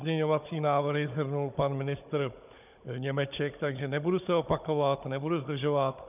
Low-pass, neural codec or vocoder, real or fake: 3.6 kHz; codec, 16 kHz, 16 kbps, FunCodec, trained on Chinese and English, 50 frames a second; fake